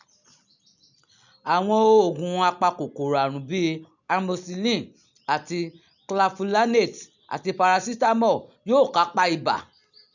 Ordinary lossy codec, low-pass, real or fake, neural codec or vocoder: none; 7.2 kHz; real; none